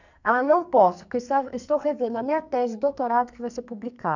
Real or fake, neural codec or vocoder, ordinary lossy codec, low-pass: fake; codec, 44.1 kHz, 2.6 kbps, SNAC; none; 7.2 kHz